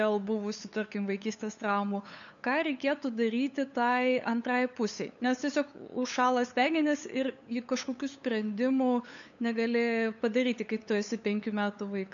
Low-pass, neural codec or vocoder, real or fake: 7.2 kHz; codec, 16 kHz, 8 kbps, FunCodec, trained on LibriTTS, 25 frames a second; fake